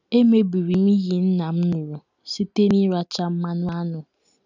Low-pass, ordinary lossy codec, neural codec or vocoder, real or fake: 7.2 kHz; none; none; real